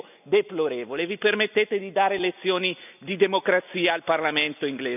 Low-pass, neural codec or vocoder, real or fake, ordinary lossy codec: 3.6 kHz; none; real; none